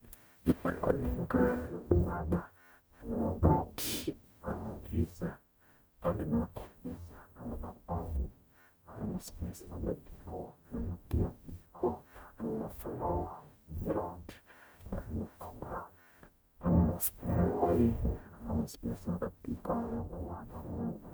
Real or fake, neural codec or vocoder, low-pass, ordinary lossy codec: fake; codec, 44.1 kHz, 0.9 kbps, DAC; none; none